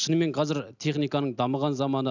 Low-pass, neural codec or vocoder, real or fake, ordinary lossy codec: 7.2 kHz; none; real; none